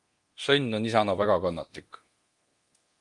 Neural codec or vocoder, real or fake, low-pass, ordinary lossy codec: codec, 24 kHz, 0.9 kbps, DualCodec; fake; 10.8 kHz; Opus, 24 kbps